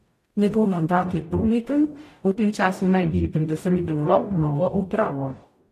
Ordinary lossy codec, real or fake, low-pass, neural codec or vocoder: AAC, 64 kbps; fake; 14.4 kHz; codec, 44.1 kHz, 0.9 kbps, DAC